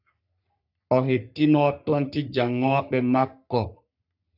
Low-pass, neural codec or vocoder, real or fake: 5.4 kHz; codec, 44.1 kHz, 3.4 kbps, Pupu-Codec; fake